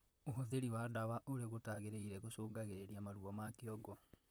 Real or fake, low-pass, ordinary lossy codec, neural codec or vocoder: fake; none; none; vocoder, 44.1 kHz, 128 mel bands, Pupu-Vocoder